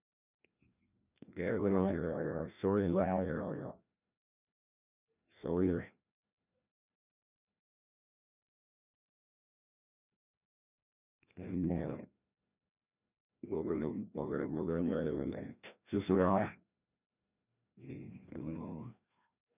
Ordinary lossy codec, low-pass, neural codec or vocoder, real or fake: none; 3.6 kHz; codec, 16 kHz, 1 kbps, FreqCodec, larger model; fake